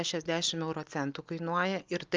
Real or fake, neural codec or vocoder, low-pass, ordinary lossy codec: real; none; 7.2 kHz; Opus, 24 kbps